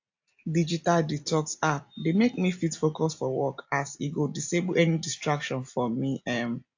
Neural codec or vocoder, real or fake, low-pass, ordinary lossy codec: none; real; 7.2 kHz; AAC, 48 kbps